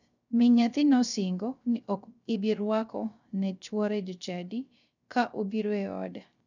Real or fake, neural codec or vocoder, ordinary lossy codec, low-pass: fake; codec, 16 kHz, 0.3 kbps, FocalCodec; none; 7.2 kHz